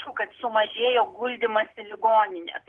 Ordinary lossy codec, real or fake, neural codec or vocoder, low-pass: Opus, 16 kbps; real; none; 10.8 kHz